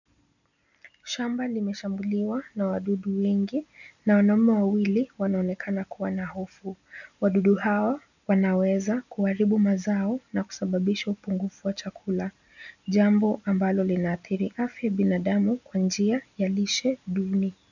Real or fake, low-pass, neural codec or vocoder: real; 7.2 kHz; none